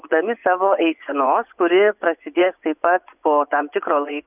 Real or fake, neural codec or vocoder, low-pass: fake; vocoder, 24 kHz, 100 mel bands, Vocos; 3.6 kHz